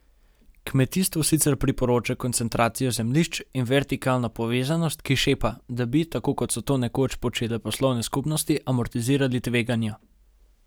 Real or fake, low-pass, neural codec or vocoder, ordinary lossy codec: real; none; none; none